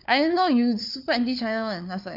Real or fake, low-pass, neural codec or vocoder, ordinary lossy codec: fake; 5.4 kHz; codec, 16 kHz, 4 kbps, FunCodec, trained on LibriTTS, 50 frames a second; none